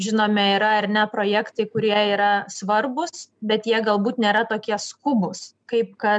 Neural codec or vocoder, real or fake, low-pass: none; real; 9.9 kHz